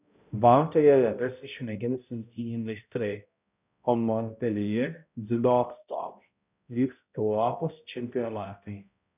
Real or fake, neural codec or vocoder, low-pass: fake; codec, 16 kHz, 0.5 kbps, X-Codec, HuBERT features, trained on balanced general audio; 3.6 kHz